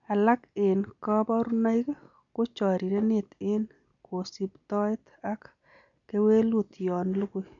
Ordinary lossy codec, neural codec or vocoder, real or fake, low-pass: none; none; real; 7.2 kHz